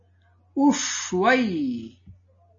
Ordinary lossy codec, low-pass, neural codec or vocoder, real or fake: MP3, 32 kbps; 7.2 kHz; none; real